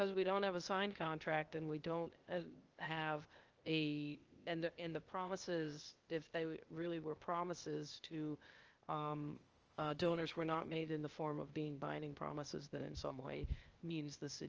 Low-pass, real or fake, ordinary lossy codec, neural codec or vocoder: 7.2 kHz; fake; Opus, 24 kbps; codec, 16 kHz, 0.8 kbps, ZipCodec